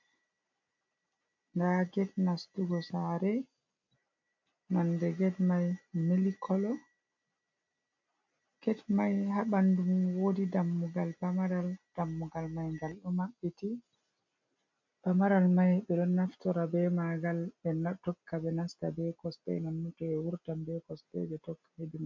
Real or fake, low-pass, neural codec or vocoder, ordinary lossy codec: real; 7.2 kHz; none; MP3, 48 kbps